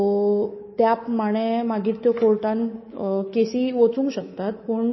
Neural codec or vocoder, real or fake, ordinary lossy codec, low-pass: codec, 24 kHz, 3.1 kbps, DualCodec; fake; MP3, 24 kbps; 7.2 kHz